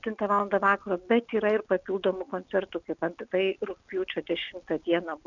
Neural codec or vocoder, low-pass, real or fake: none; 7.2 kHz; real